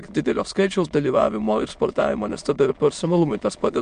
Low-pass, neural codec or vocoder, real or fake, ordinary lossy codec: 9.9 kHz; autoencoder, 22.05 kHz, a latent of 192 numbers a frame, VITS, trained on many speakers; fake; MP3, 64 kbps